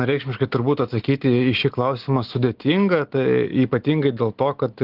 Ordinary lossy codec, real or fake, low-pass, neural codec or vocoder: Opus, 16 kbps; real; 5.4 kHz; none